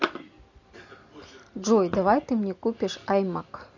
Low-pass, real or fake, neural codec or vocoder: 7.2 kHz; real; none